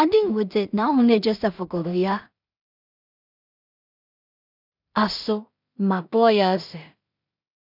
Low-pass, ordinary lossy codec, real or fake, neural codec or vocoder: 5.4 kHz; none; fake; codec, 16 kHz in and 24 kHz out, 0.4 kbps, LongCat-Audio-Codec, two codebook decoder